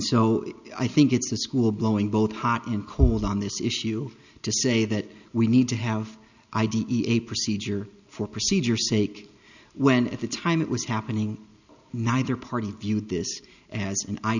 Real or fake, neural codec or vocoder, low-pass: real; none; 7.2 kHz